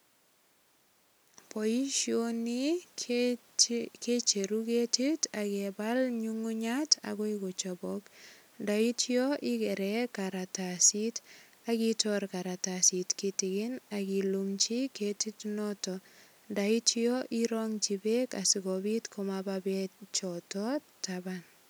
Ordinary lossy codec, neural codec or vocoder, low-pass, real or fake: none; none; none; real